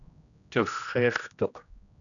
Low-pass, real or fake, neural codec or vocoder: 7.2 kHz; fake; codec, 16 kHz, 1 kbps, X-Codec, HuBERT features, trained on general audio